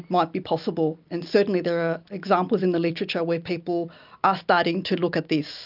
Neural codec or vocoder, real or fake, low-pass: none; real; 5.4 kHz